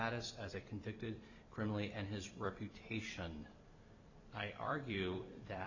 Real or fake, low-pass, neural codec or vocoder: fake; 7.2 kHz; vocoder, 44.1 kHz, 128 mel bands every 256 samples, BigVGAN v2